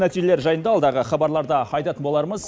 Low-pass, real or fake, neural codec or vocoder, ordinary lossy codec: none; real; none; none